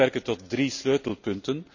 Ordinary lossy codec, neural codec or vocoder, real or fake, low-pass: none; none; real; 7.2 kHz